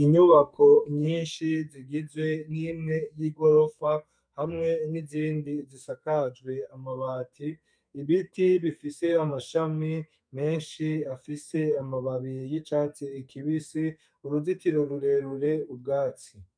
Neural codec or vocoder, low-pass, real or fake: codec, 32 kHz, 1.9 kbps, SNAC; 9.9 kHz; fake